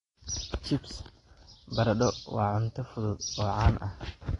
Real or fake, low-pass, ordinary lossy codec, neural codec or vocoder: real; 10.8 kHz; AAC, 32 kbps; none